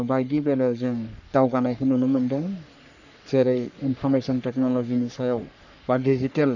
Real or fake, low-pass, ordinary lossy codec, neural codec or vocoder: fake; 7.2 kHz; none; codec, 44.1 kHz, 3.4 kbps, Pupu-Codec